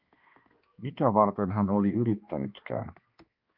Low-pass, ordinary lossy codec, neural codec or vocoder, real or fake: 5.4 kHz; Opus, 32 kbps; codec, 16 kHz, 2 kbps, X-Codec, HuBERT features, trained on balanced general audio; fake